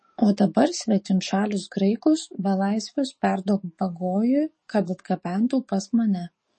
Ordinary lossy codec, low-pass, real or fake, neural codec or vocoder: MP3, 32 kbps; 10.8 kHz; fake; codec, 44.1 kHz, 7.8 kbps, DAC